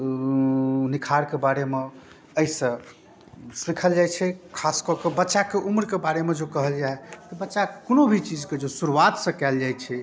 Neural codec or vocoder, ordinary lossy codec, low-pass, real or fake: none; none; none; real